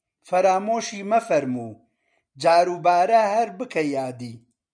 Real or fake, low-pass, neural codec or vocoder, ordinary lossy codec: real; 9.9 kHz; none; MP3, 96 kbps